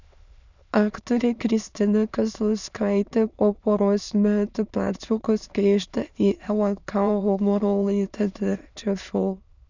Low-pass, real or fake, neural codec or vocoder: 7.2 kHz; fake; autoencoder, 22.05 kHz, a latent of 192 numbers a frame, VITS, trained on many speakers